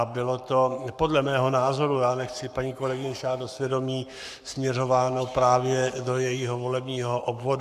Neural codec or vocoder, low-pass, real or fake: codec, 44.1 kHz, 7.8 kbps, Pupu-Codec; 14.4 kHz; fake